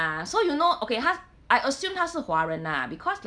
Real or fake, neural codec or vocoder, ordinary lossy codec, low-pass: real; none; none; 9.9 kHz